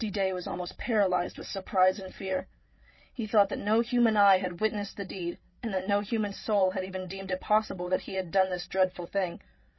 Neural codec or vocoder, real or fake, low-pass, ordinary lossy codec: codec, 16 kHz, 8 kbps, FreqCodec, larger model; fake; 7.2 kHz; MP3, 24 kbps